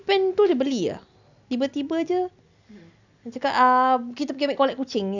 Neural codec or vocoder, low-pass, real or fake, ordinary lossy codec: none; 7.2 kHz; real; none